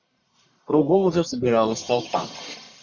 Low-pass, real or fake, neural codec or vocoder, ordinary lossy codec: 7.2 kHz; fake; codec, 44.1 kHz, 1.7 kbps, Pupu-Codec; Opus, 64 kbps